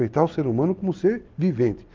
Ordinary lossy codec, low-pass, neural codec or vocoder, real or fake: Opus, 32 kbps; 7.2 kHz; none; real